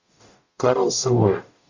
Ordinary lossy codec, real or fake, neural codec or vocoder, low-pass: Opus, 64 kbps; fake; codec, 44.1 kHz, 0.9 kbps, DAC; 7.2 kHz